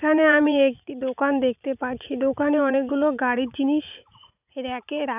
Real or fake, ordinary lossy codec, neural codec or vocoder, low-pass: fake; none; vocoder, 44.1 kHz, 80 mel bands, Vocos; 3.6 kHz